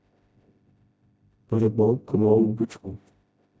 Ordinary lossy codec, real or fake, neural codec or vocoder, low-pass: none; fake; codec, 16 kHz, 0.5 kbps, FreqCodec, smaller model; none